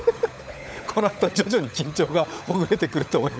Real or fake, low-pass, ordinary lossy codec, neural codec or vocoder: fake; none; none; codec, 16 kHz, 16 kbps, FunCodec, trained on LibriTTS, 50 frames a second